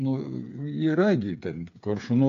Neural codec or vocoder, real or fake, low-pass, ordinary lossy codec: codec, 16 kHz, 8 kbps, FreqCodec, smaller model; fake; 7.2 kHz; AAC, 96 kbps